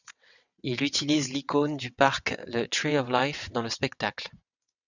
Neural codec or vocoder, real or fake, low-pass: vocoder, 22.05 kHz, 80 mel bands, WaveNeXt; fake; 7.2 kHz